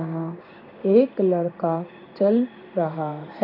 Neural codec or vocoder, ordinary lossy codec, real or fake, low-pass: codec, 16 kHz in and 24 kHz out, 1 kbps, XY-Tokenizer; none; fake; 5.4 kHz